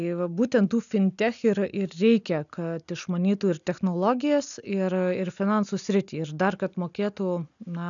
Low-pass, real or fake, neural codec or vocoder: 7.2 kHz; real; none